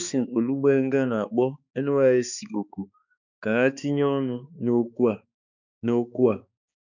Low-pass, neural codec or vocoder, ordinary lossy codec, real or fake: 7.2 kHz; codec, 16 kHz, 2 kbps, X-Codec, HuBERT features, trained on balanced general audio; none; fake